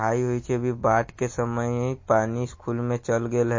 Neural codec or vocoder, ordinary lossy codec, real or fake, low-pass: none; MP3, 32 kbps; real; 7.2 kHz